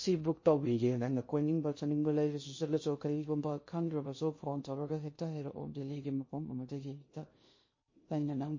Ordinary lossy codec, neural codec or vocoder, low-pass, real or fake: MP3, 32 kbps; codec, 16 kHz in and 24 kHz out, 0.6 kbps, FocalCodec, streaming, 2048 codes; 7.2 kHz; fake